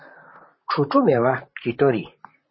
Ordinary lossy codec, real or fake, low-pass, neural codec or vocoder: MP3, 24 kbps; fake; 7.2 kHz; vocoder, 44.1 kHz, 128 mel bands, Pupu-Vocoder